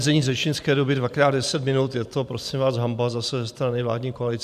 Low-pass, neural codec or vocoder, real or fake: 14.4 kHz; vocoder, 44.1 kHz, 128 mel bands every 512 samples, BigVGAN v2; fake